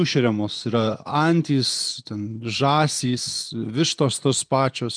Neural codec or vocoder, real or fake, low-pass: vocoder, 24 kHz, 100 mel bands, Vocos; fake; 9.9 kHz